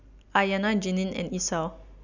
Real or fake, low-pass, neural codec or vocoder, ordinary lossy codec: real; 7.2 kHz; none; none